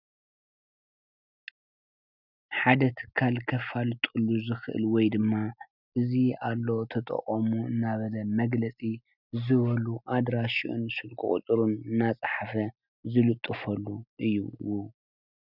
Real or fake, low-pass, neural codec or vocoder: real; 5.4 kHz; none